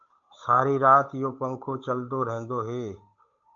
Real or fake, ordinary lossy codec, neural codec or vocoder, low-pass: fake; AAC, 64 kbps; codec, 16 kHz, 8 kbps, FunCodec, trained on Chinese and English, 25 frames a second; 7.2 kHz